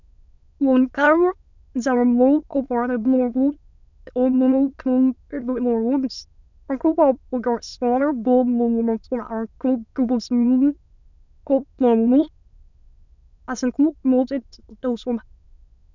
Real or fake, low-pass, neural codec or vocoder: fake; 7.2 kHz; autoencoder, 22.05 kHz, a latent of 192 numbers a frame, VITS, trained on many speakers